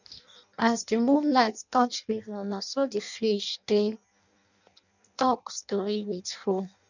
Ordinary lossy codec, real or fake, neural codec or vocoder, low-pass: none; fake; codec, 16 kHz in and 24 kHz out, 0.6 kbps, FireRedTTS-2 codec; 7.2 kHz